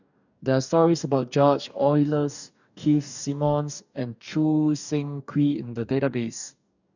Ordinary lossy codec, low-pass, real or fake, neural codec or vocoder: none; 7.2 kHz; fake; codec, 44.1 kHz, 2.6 kbps, DAC